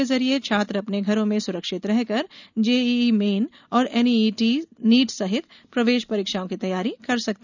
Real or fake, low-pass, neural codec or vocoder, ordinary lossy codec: real; 7.2 kHz; none; none